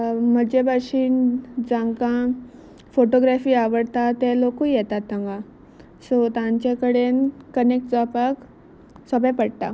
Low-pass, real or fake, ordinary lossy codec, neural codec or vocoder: none; real; none; none